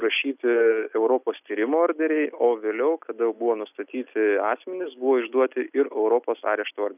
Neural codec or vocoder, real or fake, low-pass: none; real; 3.6 kHz